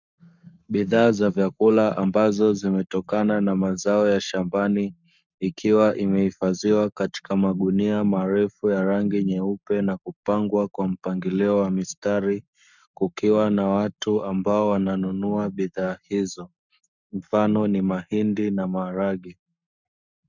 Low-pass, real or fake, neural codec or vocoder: 7.2 kHz; fake; codec, 44.1 kHz, 7.8 kbps, Pupu-Codec